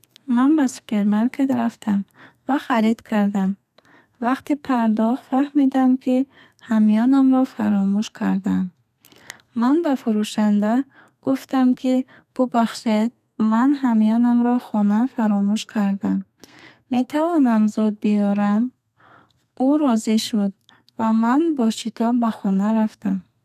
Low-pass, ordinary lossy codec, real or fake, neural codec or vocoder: 14.4 kHz; none; fake; codec, 32 kHz, 1.9 kbps, SNAC